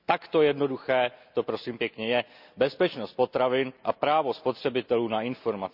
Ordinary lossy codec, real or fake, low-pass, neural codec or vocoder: none; real; 5.4 kHz; none